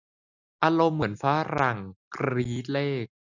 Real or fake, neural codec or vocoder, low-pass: real; none; 7.2 kHz